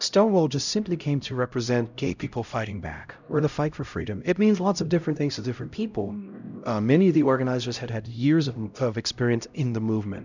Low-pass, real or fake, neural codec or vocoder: 7.2 kHz; fake; codec, 16 kHz, 0.5 kbps, X-Codec, HuBERT features, trained on LibriSpeech